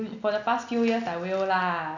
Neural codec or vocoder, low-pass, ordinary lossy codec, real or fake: none; 7.2 kHz; none; real